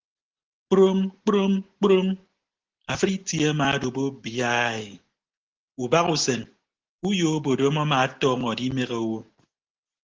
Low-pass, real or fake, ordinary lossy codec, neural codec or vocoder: 7.2 kHz; real; Opus, 16 kbps; none